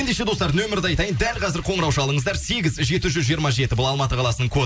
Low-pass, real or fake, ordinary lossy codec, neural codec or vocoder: none; real; none; none